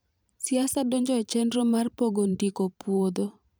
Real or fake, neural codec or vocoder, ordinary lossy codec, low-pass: fake; vocoder, 44.1 kHz, 128 mel bands every 512 samples, BigVGAN v2; none; none